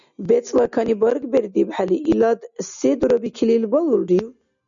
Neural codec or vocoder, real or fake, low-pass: none; real; 7.2 kHz